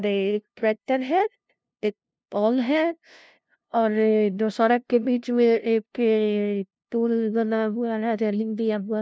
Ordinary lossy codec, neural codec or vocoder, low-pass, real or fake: none; codec, 16 kHz, 0.5 kbps, FunCodec, trained on LibriTTS, 25 frames a second; none; fake